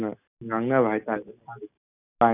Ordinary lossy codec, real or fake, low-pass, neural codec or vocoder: none; real; 3.6 kHz; none